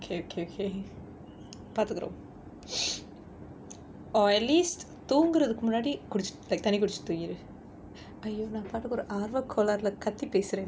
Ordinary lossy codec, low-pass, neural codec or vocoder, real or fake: none; none; none; real